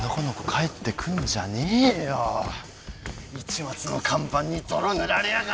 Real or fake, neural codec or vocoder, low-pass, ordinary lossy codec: real; none; none; none